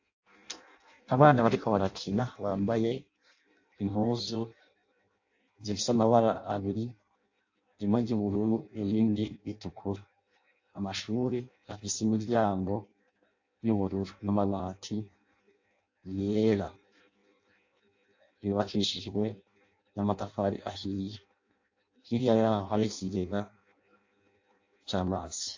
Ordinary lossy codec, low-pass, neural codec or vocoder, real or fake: AAC, 48 kbps; 7.2 kHz; codec, 16 kHz in and 24 kHz out, 0.6 kbps, FireRedTTS-2 codec; fake